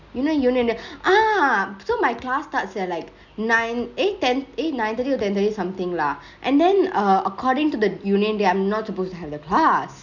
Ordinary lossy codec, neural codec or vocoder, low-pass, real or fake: none; none; 7.2 kHz; real